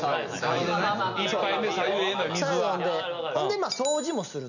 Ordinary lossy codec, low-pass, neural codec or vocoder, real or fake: none; 7.2 kHz; none; real